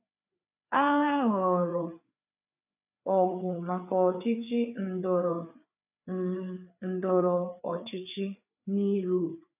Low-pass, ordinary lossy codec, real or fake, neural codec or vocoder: 3.6 kHz; none; fake; codec, 16 kHz, 4 kbps, FreqCodec, larger model